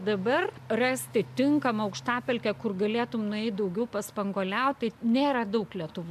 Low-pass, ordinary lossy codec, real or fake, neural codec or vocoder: 14.4 kHz; MP3, 96 kbps; real; none